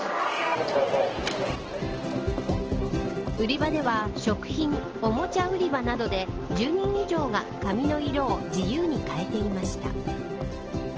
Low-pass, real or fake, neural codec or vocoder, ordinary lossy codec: 7.2 kHz; real; none; Opus, 16 kbps